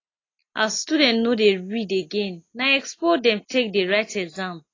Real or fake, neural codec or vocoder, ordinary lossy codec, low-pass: real; none; AAC, 32 kbps; 7.2 kHz